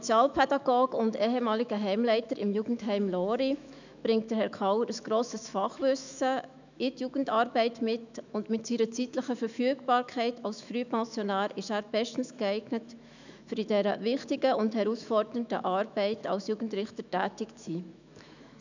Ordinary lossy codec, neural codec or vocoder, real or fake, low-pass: none; autoencoder, 48 kHz, 128 numbers a frame, DAC-VAE, trained on Japanese speech; fake; 7.2 kHz